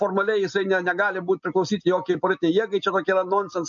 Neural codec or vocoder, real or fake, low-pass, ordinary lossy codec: none; real; 7.2 kHz; MP3, 48 kbps